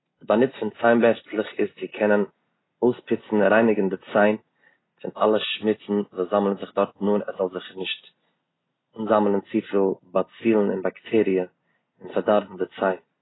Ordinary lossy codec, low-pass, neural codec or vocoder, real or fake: AAC, 16 kbps; 7.2 kHz; none; real